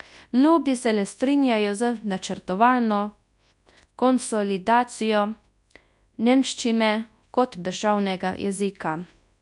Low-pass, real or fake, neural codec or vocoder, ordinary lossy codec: 10.8 kHz; fake; codec, 24 kHz, 0.9 kbps, WavTokenizer, large speech release; none